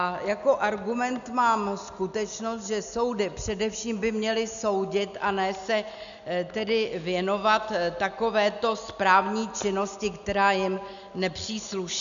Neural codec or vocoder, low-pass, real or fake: none; 7.2 kHz; real